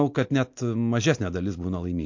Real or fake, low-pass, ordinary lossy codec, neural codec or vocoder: real; 7.2 kHz; MP3, 48 kbps; none